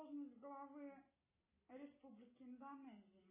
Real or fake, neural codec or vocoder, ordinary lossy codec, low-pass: real; none; MP3, 16 kbps; 3.6 kHz